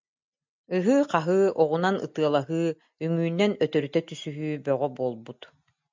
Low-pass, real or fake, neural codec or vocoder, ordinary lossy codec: 7.2 kHz; real; none; MP3, 64 kbps